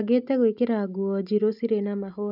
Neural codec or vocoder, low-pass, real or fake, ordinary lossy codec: none; 5.4 kHz; real; none